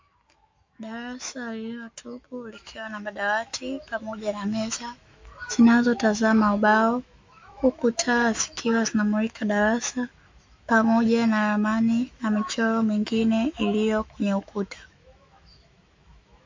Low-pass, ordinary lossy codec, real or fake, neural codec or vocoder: 7.2 kHz; MP3, 48 kbps; fake; autoencoder, 48 kHz, 128 numbers a frame, DAC-VAE, trained on Japanese speech